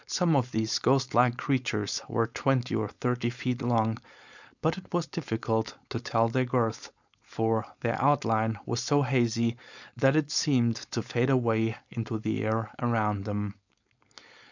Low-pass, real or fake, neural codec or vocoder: 7.2 kHz; fake; codec, 16 kHz, 4.8 kbps, FACodec